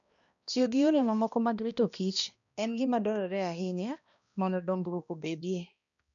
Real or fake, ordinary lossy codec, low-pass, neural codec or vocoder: fake; none; 7.2 kHz; codec, 16 kHz, 1 kbps, X-Codec, HuBERT features, trained on balanced general audio